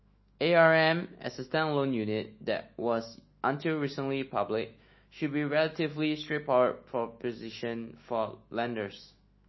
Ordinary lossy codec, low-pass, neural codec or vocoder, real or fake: MP3, 24 kbps; 7.2 kHz; autoencoder, 48 kHz, 128 numbers a frame, DAC-VAE, trained on Japanese speech; fake